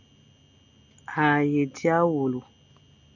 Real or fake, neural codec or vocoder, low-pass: real; none; 7.2 kHz